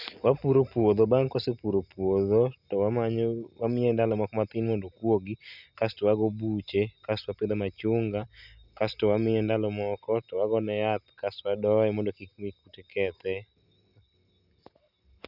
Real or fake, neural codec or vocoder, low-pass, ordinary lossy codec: real; none; 5.4 kHz; none